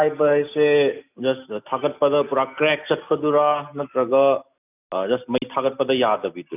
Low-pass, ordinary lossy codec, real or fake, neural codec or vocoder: 3.6 kHz; none; real; none